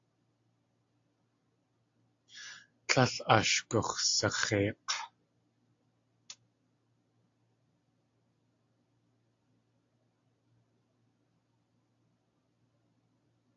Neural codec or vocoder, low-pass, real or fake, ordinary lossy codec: none; 7.2 kHz; real; AAC, 48 kbps